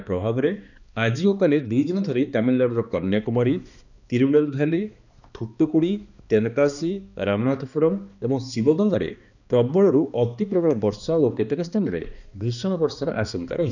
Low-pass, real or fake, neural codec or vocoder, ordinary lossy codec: 7.2 kHz; fake; codec, 16 kHz, 2 kbps, X-Codec, HuBERT features, trained on balanced general audio; none